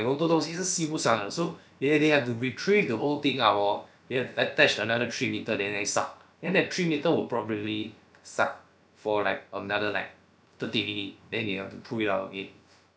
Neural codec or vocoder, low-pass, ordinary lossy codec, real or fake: codec, 16 kHz, about 1 kbps, DyCAST, with the encoder's durations; none; none; fake